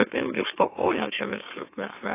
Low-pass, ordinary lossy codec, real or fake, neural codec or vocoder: 3.6 kHz; AAC, 24 kbps; fake; autoencoder, 44.1 kHz, a latent of 192 numbers a frame, MeloTTS